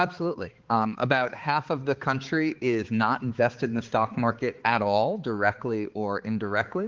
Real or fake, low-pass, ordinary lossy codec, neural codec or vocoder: fake; 7.2 kHz; Opus, 16 kbps; codec, 16 kHz, 4 kbps, X-Codec, HuBERT features, trained on balanced general audio